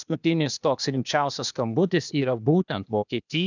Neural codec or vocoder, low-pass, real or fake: codec, 16 kHz, 0.8 kbps, ZipCodec; 7.2 kHz; fake